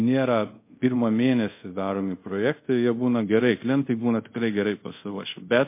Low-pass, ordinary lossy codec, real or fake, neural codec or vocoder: 3.6 kHz; MP3, 24 kbps; fake; codec, 24 kHz, 0.5 kbps, DualCodec